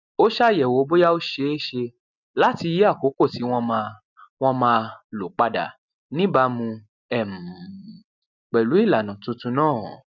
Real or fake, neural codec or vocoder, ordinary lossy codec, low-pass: real; none; none; 7.2 kHz